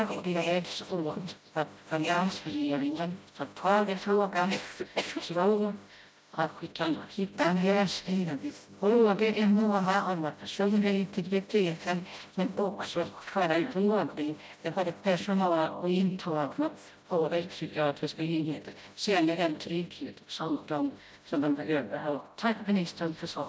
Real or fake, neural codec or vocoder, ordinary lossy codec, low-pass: fake; codec, 16 kHz, 0.5 kbps, FreqCodec, smaller model; none; none